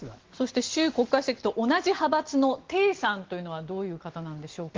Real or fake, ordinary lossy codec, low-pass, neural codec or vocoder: real; Opus, 16 kbps; 7.2 kHz; none